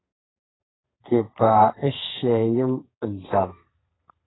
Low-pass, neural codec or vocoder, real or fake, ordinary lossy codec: 7.2 kHz; codec, 32 kHz, 1.9 kbps, SNAC; fake; AAC, 16 kbps